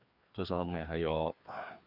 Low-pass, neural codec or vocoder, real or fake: 5.4 kHz; codec, 16 kHz, 2 kbps, FreqCodec, larger model; fake